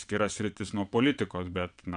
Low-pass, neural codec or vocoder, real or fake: 9.9 kHz; vocoder, 22.05 kHz, 80 mel bands, WaveNeXt; fake